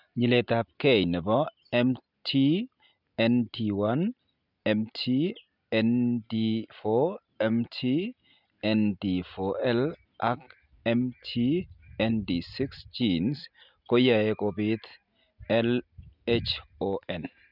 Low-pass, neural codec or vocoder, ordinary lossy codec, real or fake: 5.4 kHz; none; none; real